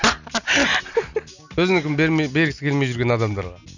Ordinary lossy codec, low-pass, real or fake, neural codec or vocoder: none; 7.2 kHz; real; none